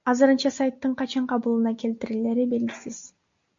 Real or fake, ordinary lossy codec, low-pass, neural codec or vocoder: real; MP3, 48 kbps; 7.2 kHz; none